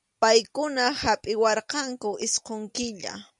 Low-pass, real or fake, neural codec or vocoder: 10.8 kHz; real; none